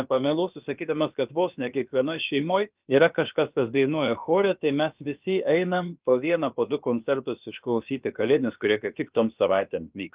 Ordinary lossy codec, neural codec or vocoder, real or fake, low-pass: Opus, 32 kbps; codec, 16 kHz, about 1 kbps, DyCAST, with the encoder's durations; fake; 3.6 kHz